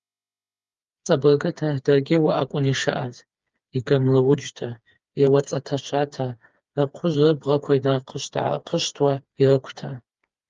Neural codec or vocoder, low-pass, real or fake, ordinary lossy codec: codec, 16 kHz, 4 kbps, FreqCodec, smaller model; 7.2 kHz; fake; Opus, 32 kbps